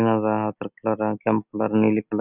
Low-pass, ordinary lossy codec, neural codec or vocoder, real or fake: 3.6 kHz; none; none; real